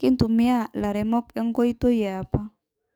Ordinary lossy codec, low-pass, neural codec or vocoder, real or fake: none; none; codec, 44.1 kHz, 7.8 kbps, DAC; fake